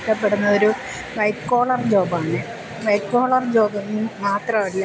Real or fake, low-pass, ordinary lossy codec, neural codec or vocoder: real; none; none; none